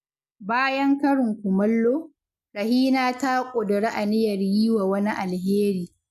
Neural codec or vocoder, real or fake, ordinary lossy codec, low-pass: none; real; none; 14.4 kHz